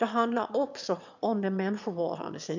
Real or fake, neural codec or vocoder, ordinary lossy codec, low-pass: fake; autoencoder, 22.05 kHz, a latent of 192 numbers a frame, VITS, trained on one speaker; none; 7.2 kHz